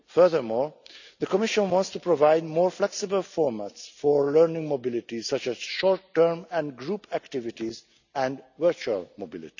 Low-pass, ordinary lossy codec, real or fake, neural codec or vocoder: 7.2 kHz; none; real; none